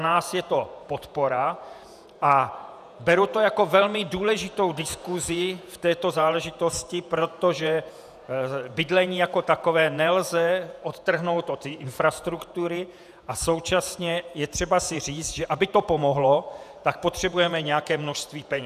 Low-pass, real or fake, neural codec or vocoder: 14.4 kHz; fake; vocoder, 48 kHz, 128 mel bands, Vocos